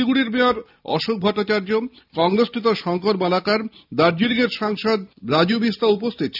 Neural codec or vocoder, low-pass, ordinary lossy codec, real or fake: none; 5.4 kHz; none; real